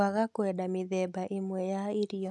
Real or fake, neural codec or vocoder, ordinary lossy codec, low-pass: real; none; none; none